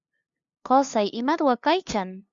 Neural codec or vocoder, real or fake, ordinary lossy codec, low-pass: codec, 16 kHz, 2 kbps, FunCodec, trained on LibriTTS, 25 frames a second; fake; Opus, 64 kbps; 7.2 kHz